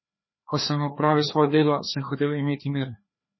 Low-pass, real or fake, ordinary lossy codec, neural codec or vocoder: 7.2 kHz; fake; MP3, 24 kbps; codec, 16 kHz, 2 kbps, FreqCodec, larger model